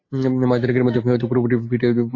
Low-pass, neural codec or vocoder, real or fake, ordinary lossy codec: 7.2 kHz; none; real; AAC, 32 kbps